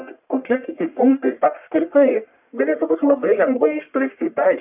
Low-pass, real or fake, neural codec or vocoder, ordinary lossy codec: 3.6 kHz; fake; codec, 44.1 kHz, 1.7 kbps, Pupu-Codec; AAC, 32 kbps